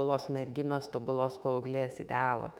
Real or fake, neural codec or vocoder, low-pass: fake; autoencoder, 48 kHz, 32 numbers a frame, DAC-VAE, trained on Japanese speech; 19.8 kHz